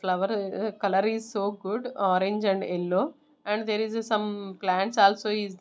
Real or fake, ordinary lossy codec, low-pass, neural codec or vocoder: real; none; none; none